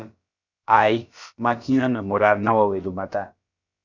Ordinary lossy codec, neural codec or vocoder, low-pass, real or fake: Opus, 64 kbps; codec, 16 kHz, about 1 kbps, DyCAST, with the encoder's durations; 7.2 kHz; fake